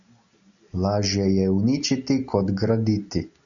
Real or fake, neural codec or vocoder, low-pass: real; none; 7.2 kHz